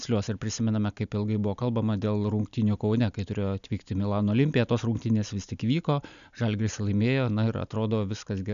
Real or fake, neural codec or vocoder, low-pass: real; none; 7.2 kHz